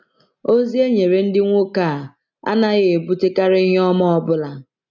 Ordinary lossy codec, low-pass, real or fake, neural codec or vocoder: none; 7.2 kHz; real; none